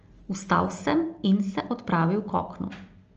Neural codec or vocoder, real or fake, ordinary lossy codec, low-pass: none; real; Opus, 24 kbps; 7.2 kHz